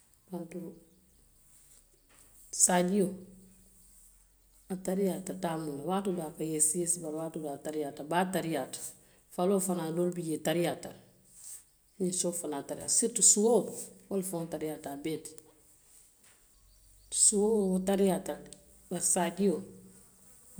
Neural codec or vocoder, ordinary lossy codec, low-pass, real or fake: none; none; none; real